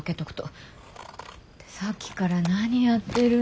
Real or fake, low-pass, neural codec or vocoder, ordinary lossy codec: real; none; none; none